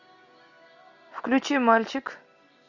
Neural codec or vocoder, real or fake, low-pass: none; real; 7.2 kHz